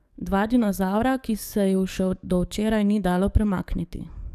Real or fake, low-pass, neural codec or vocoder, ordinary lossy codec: fake; 14.4 kHz; codec, 44.1 kHz, 7.8 kbps, DAC; none